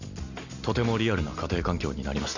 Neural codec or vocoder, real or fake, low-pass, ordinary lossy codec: none; real; 7.2 kHz; none